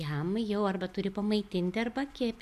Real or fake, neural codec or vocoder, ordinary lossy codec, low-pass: real; none; MP3, 96 kbps; 14.4 kHz